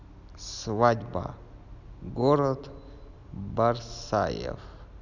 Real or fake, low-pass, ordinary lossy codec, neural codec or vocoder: real; 7.2 kHz; none; none